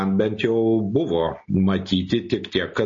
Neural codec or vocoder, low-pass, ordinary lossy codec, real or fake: none; 7.2 kHz; MP3, 32 kbps; real